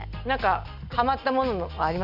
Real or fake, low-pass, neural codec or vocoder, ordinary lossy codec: real; 5.4 kHz; none; none